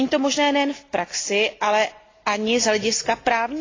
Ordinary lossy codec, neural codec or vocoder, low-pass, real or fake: AAC, 32 kbps; none; 7.2 kHz; real